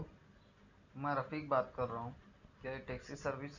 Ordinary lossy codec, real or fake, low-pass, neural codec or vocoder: none; real; 7.2 kHz; none